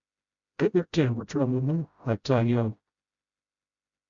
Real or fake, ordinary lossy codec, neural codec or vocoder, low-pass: fake; Opus, 64 kbps; codec, 16 kHz, 0.5 kbps, FreqCodec, smaller model; 7.2 kHz